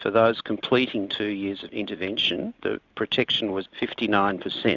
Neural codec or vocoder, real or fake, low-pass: none; real; 7.2 kHz